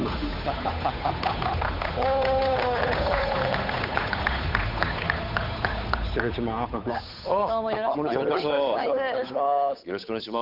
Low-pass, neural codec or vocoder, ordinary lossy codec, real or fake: 5.4 kHz; codec, 16 kHz, 8 kbps, FunCodec, trained on Chinese and English, 25 frames a second; none; fake